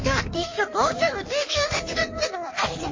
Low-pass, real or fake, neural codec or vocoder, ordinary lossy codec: 7.2 kHz; fake; codec, 16 kHz in and 24 kHz out, 1.1 kbps, FireRedTTS-2 codec; MP3, 32 kbps